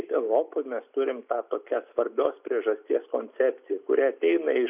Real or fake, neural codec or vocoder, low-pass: real; none; 3.6 kHz